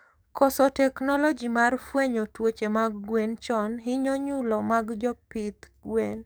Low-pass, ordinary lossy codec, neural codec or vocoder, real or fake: none; none; codec, 44.1 kHz, 7.8 kbps, DAC; fake